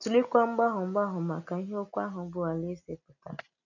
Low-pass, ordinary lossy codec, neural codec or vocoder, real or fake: 7.2 kHz; none; none; real